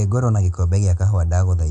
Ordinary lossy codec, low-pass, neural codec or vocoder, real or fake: AAC, 96 kbps; 10.8 kHz; none; real